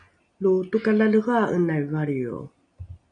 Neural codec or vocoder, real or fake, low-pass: none; real; 9.9 kHz